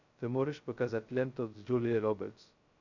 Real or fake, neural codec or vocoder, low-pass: fake; codec, 16 kHz, 0.2 kbps, FocalCodec; 7.2 kHz